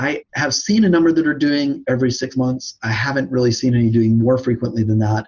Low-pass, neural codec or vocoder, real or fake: 7.2 kHz; none; real